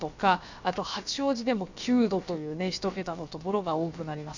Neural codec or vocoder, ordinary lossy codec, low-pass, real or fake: codec, 16 kHz, about 1 kbps, DyCAST, with the encoder's durations; none; 7.2 kHz; fake